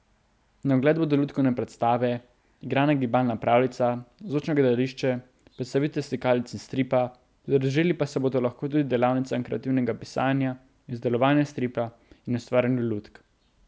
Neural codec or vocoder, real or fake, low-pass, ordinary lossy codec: none; real; none; none